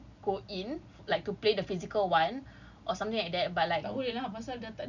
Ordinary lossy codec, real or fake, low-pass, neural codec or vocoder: none; real; 7.2 kHz; none